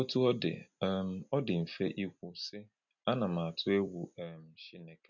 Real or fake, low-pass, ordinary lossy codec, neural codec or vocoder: real; 7.2 kHz; none; none